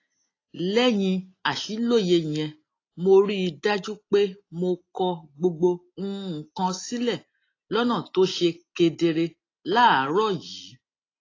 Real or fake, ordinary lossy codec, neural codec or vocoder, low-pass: real; AAC, 32 kbps; none; 7.2 kHz